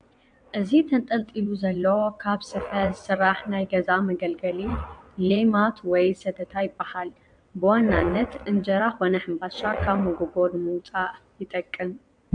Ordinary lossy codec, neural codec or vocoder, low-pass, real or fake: AAC, 64 kbps; vocoder, 22.05 kHz, 80 mel bands, WaveNeXt; 9.9 kHz; fake